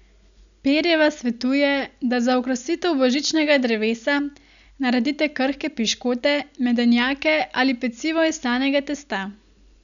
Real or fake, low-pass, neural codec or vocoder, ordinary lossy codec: real; 7.2 kHz; none; none